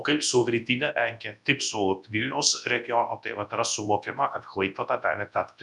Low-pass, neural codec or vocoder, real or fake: 10.8 kHz; codec, 24 kHz, 0.9 kbps, WavTokenizer, large speech release; fake